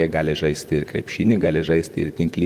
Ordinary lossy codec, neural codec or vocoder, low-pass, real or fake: Opus, 24 kbps; vocoder, 44.1 kHz, 128 mel bands, Pupu-Vocoder; 14.4 kHz; fake